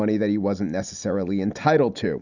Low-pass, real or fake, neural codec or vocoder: 7.2 kHz; real; none